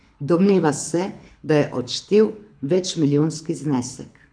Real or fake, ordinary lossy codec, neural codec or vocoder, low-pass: fake; none; codec, 24 kHz, 6 kbps, HILCodec; 9.9 kHz